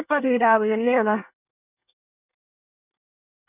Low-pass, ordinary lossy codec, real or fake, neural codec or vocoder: 3.6 kHz; none; fake; codec, 24 kHz, 1 kbps, SNAC